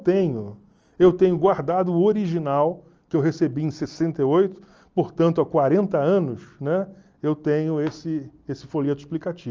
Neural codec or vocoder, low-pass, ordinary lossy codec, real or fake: none; 7.2 kHz; Opus, 24 kbps; real